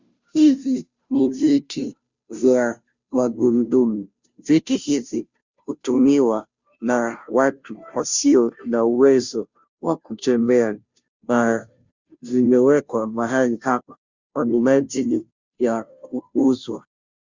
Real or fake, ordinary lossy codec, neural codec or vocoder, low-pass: fake; Opus, 64 kbps; codec, 16 kHz, 0.5 kbps, FunCodec, trained on Chinese and English, 25 frames a second; 7.2 kHz